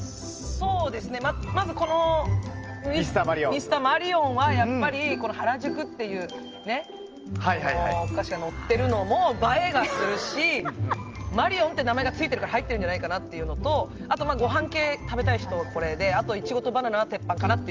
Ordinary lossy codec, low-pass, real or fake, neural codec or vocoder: Opus, 24 kbps; 7.2 kHz; real; none